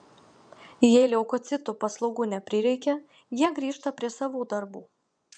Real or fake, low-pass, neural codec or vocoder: fake; 9.9 kHz; vocoder, 24 kHz, 100 mel bands, Vocos